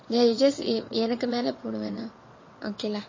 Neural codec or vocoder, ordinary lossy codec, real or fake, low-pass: vocoder, 22.05 kHz, 80 mel bands, WaveNeXt; MP3, 32 kbps; fake; 7.2 kHz